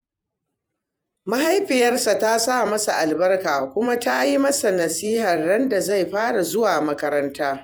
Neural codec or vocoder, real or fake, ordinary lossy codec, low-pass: vocoder, 48 kHz, 128 mel bands, Vocos; fake; none; none